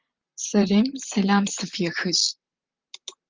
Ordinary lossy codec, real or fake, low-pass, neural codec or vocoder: Opus, 32 kbps; fake; 7.2 kHz; vocoder, 44.1 kHz, 128 mel bands, Pupu-Vocoder